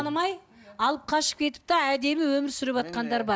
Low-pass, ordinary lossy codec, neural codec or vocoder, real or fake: none; none; none; real